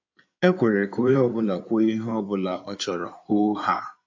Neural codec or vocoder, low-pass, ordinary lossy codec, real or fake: codec, 16 kHz in and 24 kHz out, 2.2 kbps, FireRedTTS-2 codec; 7.2 kHz; AAC, 48 kbps; fake